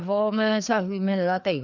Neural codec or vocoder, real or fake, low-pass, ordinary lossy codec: codec, 16 kHz, 2 kbps, FreqCodec, larger model; fake; 7.2 kHz; none